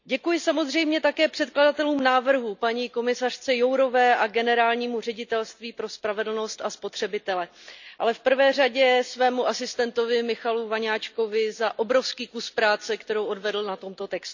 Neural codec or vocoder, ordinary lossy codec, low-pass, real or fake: none; none; 7.2 kHz; real